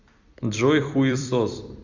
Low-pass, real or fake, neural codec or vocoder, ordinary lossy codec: 7.2 kHz; fake; vocoder, 44.1 kHz, 128 mel bands every 256 samples, BigVGAN v2; Opus, 64 kbps